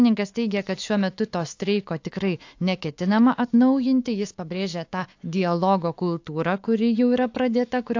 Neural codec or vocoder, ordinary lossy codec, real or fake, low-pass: autoencoder, 48 kHz, 128 numbers a frame, DAC-VAE, trained on Japanese speech; AAC, 48 kbps; fake; 7.2 kHz